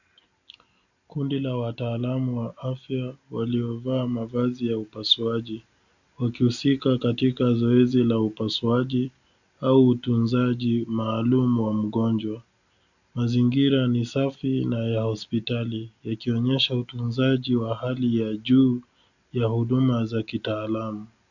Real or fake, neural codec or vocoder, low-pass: real; none; 7.2 kHz